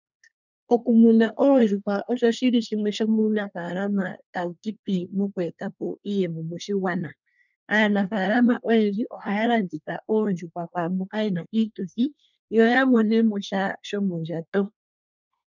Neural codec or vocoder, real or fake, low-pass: codec, 24 kHz, 1 kbps, SNAC; fake; 7.2 kHz